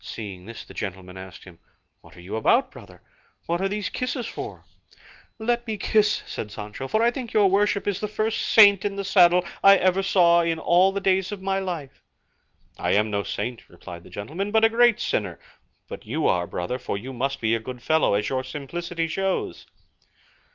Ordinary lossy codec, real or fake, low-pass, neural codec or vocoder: Opus, 24 kbps; real; 7.2 kHz; none